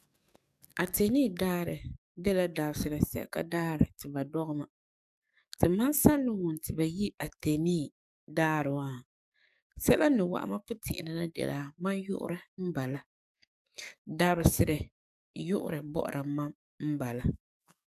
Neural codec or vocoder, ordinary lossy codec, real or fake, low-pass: codec, 44.1 kHz, 7.8 kbps, DAC; none; fake; 14.4 kHz